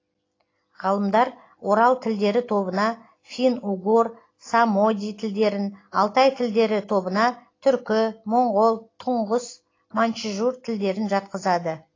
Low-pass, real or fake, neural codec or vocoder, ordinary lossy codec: 7.2 kHz; real; none; AAC, 32 kbps